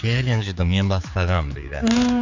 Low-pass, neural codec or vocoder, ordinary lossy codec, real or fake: 7.2 kHz; codec, 16 kHz, 4 kbps, X-Codec, HuBERT features, trained on general audio; none; fake